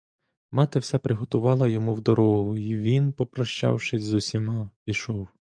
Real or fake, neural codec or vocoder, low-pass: fake; codec, 44.1 kHz, 7.8 kbps, DAC; 9.9 kHz